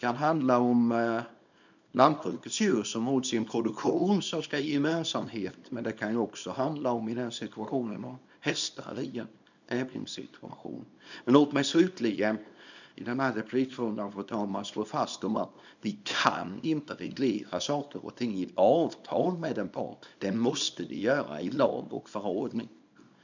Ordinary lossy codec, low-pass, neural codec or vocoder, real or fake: none; 7.2 kHz; codec, 24 kHz, 0.9 kbps, WavTokenizer, small release; fake